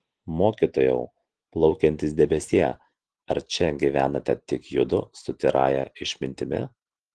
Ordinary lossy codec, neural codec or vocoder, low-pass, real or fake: Opus, 16 kbps; none; 10.8 kHz; real